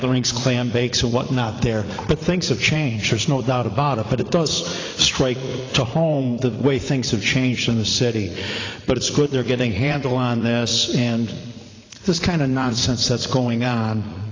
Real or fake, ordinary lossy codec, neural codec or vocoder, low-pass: fake; AAC, 32 kbps; vocoder, 44.1 kHz, 80 mel bands, Vocos; 7.2 kHz